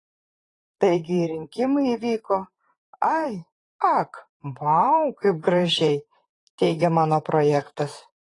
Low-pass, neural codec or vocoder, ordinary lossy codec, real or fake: 10.8 kHz; vocoder, 44.1 kHz, 128 mel bands, Pupu-Vocoder; AAC, 32 kbps; fake